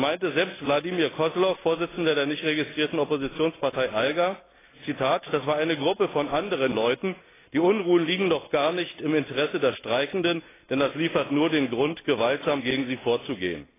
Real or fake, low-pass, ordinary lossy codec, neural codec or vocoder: real; 3.6 kHz; AAC, 16 kbps; none